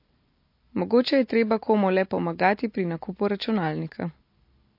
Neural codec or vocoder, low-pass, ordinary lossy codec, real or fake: none; 5.4 kHz; MP3, 32 kbps; real